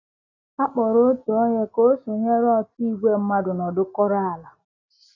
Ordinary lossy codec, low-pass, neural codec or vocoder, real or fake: none; 7.2 kHz; none; real